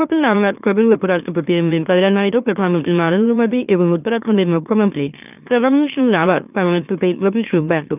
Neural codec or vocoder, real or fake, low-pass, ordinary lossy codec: autoencoder, 44.1 kHz, a latent of 192 numbers a frame, MeloTTS; fake; 3.6 kHz; none